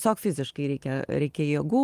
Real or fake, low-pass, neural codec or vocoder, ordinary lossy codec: real; 14.4 kHz; none; Opus, 32 kbps